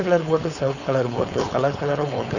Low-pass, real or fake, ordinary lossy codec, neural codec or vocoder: 7.2 kHz; fake; none; codec, 16 kHz, 4.8 kbps, FACodec